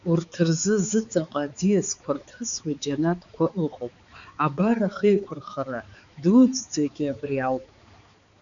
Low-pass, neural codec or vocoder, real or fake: 7.2 kHz; codec, 16 kHz, 4 kbps, X-Codec, HuBERT features, trained on balanced general audio; fake